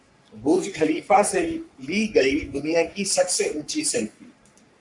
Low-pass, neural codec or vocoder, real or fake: 10.8 kHz; codec, 44.1 kHz, 3.4 kbps, Pupu-Codec; fake